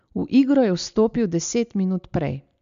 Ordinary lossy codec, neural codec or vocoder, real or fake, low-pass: MP3, 64 kbps; none; real; 7.2 kHz